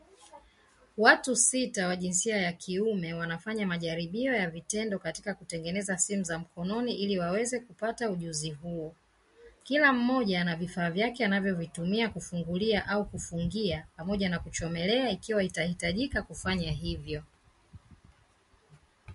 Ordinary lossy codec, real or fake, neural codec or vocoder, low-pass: MP3, 48 kbps; real; none; 14.4 kHz